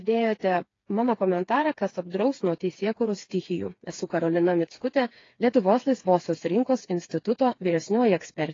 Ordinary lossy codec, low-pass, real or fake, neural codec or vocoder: AAC, 32 kbps; 7.2 kHz; fake; codec, 16 kHz, 4 kbps, FreqCodec, smaller model